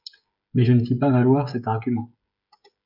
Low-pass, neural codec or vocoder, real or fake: 5.4 kHz; codec, 16 kHz, 16 kbps, FreqCodec, smaller model; fake